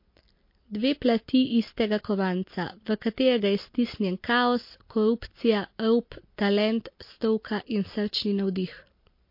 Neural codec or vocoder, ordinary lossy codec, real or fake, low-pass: none; MP3, 32 kbps; real; 5.4 kHz